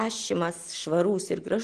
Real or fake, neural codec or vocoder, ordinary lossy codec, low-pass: real; none; Opus, 16 kbps; 9.9 kHz